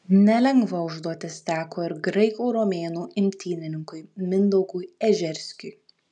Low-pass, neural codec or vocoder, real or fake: 10.8 kHz; none; real